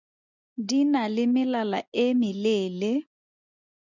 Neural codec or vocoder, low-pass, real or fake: none; 7.2 kHz; real